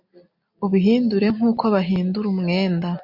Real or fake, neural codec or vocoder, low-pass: real; none; 5.4 kHz